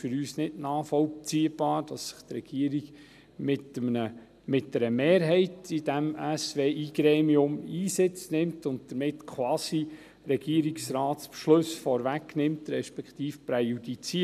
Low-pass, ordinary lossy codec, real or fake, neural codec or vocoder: 14.4 kHz; none; real; none